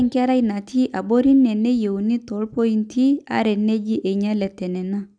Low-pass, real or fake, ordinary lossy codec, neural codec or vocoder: 9.9 kHz; real; none; none